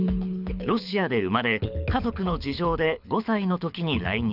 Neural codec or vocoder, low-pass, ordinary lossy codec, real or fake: codec, 24 kHz, 6 kbps, HILCodec; 5.4 kHz; none; fake